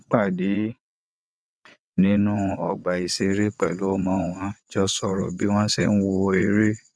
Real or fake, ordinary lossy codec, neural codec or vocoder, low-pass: fake; none; vocoder, 22.05 kHz, 80 mel bands, WaveNeXt; none